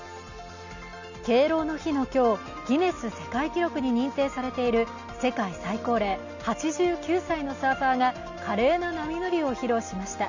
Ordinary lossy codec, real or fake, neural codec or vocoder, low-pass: none; real; none; 7.2 kHz